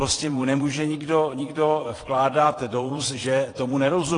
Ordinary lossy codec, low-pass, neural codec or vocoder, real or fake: AAC, 32 kbps; 9.9 kHz; vocoder, 22.05 kHz, 80 mel bands, Vocos; fake